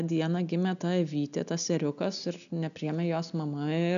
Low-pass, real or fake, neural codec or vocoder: 7.2 kHz; real; none